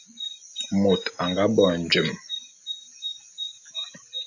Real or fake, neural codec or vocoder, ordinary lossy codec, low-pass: real; none; AAC, 48 kbps; 7.2 kHz